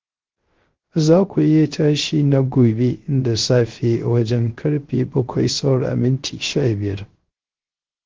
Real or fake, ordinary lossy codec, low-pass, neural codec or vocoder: fake; Opus, 16 kbps; 7.2 kHz; codec, 16 kHz, 0.3 kbps, FocalCodec